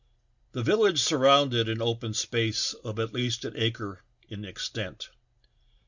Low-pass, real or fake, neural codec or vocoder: 7.2 kHz; real; none